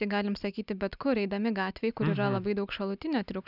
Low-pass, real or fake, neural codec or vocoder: 5.4 kHz; real; none